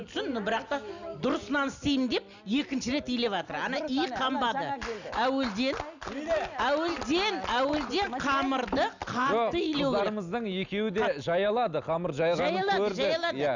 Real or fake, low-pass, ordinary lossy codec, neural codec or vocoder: real; 7.2 kHz; none; none